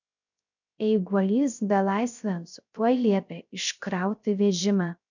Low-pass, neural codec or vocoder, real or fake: 7.2 kHz; codec, 16 kHz, 0.3 kbps, FocalCodec; fake